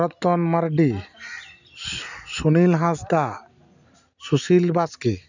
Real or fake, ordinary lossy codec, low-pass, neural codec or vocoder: real; none; 7.2 kHz; none